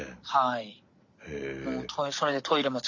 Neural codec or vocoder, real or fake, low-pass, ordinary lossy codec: none; real; 7.2 kHz; none